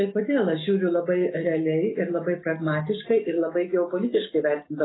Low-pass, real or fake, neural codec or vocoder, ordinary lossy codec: 7.2 kHz; real; none; AAC, 16 kbps